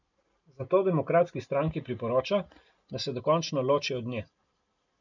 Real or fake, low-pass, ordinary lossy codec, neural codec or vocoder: real; 7.2 kHz; none; none